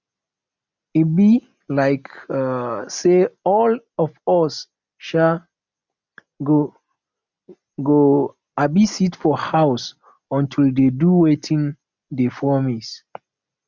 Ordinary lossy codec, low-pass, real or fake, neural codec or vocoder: none; 7.2 kHz; real; none